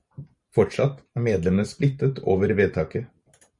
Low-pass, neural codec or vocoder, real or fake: 10.8 kHz; vocoder, 44.1 kHz, 128 mel bands every 512 samples, BigVGAN v2; fake